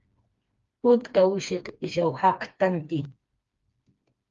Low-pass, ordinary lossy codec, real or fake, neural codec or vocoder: 7.2 kHz; Opus, 32 kbps; fake; codec, 16 kHz, 2 kbps, FreqCodec, smaller model